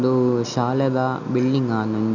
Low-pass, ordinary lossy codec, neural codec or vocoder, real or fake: 7.2 kHz; none; none; real